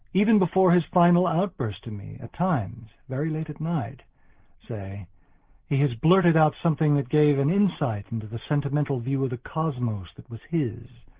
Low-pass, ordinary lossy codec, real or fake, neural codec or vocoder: 3.6 kHz; Opus, 16 kbps; real; none